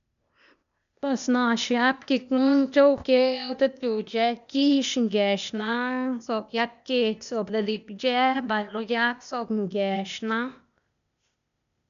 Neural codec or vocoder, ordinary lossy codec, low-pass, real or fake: codec, 16 kHz, 0.8 kbps, ZipCodec; none; 7.2 kHz; fake